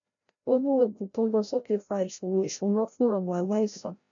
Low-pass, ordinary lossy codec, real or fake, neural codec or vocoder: 7.2 kHz; none; fake; codec, 16 kHz, 0.5 kbps, FreqCodec, larger model